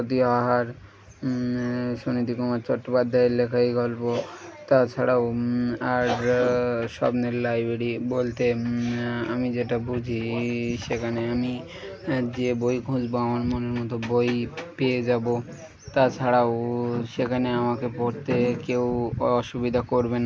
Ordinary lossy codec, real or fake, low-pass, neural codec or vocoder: none; real; none; none